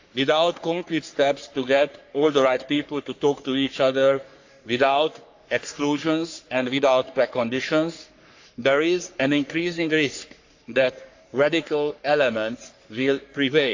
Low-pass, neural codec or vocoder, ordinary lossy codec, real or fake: 7.2 kHz; codec, 44.1 kHz, 3.4 kbps, Pupu-Codec; AAC, 48 kbps; fake